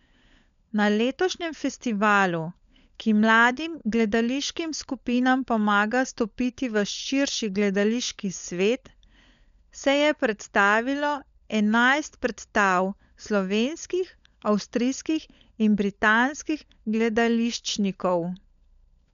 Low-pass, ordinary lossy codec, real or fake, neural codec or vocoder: 7.2 kHz; MP3, 96 kbps; fake; codec, 16 kHz, 16 kbps, FunCodec, trained on LibriTTS, 50 frames a second